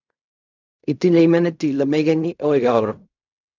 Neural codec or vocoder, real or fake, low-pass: codec, 16 kHz in and 24 kHz out, 0.4 kbps, LongCat-Audio-Codec, fine tuned four codebook decoder; fake; 7.2 kHz